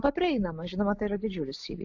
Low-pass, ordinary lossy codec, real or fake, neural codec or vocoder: 7.2 kHz; AAC, 48 kbps; real; none